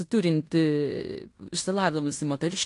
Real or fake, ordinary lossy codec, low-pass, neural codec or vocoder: fake; AAC, 48 kbps; 10.8 kHz; codec, 16 kHz in and 24 kHz out, 0.9 kbps, LongCat-Audio-Codec, fine tuned four codebook decoder